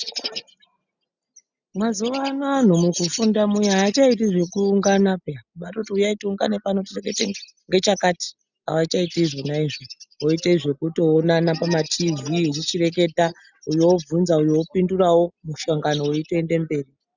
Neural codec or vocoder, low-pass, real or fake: none; 7.2 kHz; real